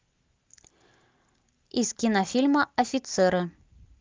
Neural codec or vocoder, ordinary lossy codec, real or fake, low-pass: none; Opus, 32 kbps; real; 7.2 kHz